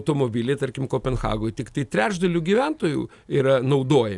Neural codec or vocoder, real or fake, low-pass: none; real; 10.8 kHz